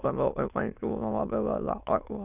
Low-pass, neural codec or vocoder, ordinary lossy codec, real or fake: 3.6 kHz; autoencoder, 22.05 kHz, a latent of 192 numbers a frame, VITS, trained on many speakers; none; fake